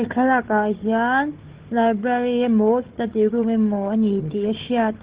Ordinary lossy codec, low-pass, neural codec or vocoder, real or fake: Opus, 16 kbps; 3.6 kHz; codec, 16 kHz, 4 kbps, FunCodec, trained on Chinese and English, 50 frames a second; fake